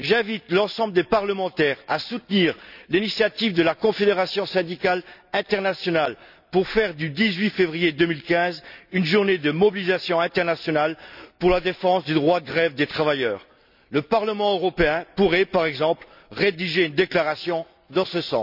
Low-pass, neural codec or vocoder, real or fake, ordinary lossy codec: 5.4 kHz; none; real; none